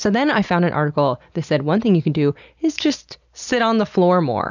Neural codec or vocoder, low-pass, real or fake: none; 7.2 kHz; real